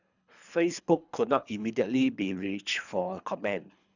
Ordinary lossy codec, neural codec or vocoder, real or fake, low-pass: none; codec, 24 kHz, 3 kbps, HILCodec; fake; 7.2 kHz